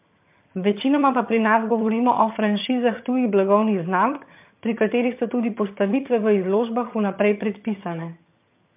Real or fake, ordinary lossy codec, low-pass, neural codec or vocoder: fake; MP3, 32 kbps; 3.6 kHz; vocoder, 22.05 kHz, 80 mel bands, HiFi-GAN